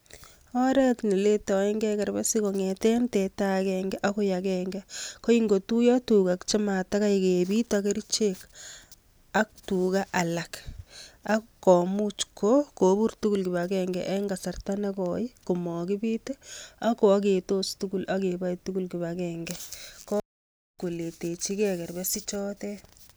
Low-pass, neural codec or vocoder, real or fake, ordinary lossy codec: none; none; real; none